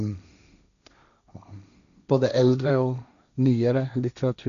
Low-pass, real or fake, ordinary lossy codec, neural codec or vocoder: 7.2 kHz; fake; none; codec, 16 kHz, 1.1 kbps, Voila-Tokenizer